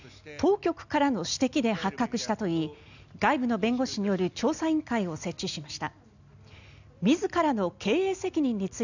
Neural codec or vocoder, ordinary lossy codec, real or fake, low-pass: none; none; real; 7.2 kHz